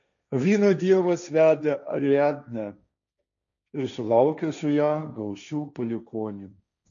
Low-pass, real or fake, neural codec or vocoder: 7.2 kHz; fake; codec, 16 kHz, 1.1 kbps, Voila-Tokenizer